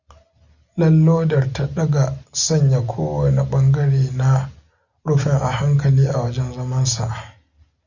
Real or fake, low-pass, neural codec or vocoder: real; 7.2 kHz; none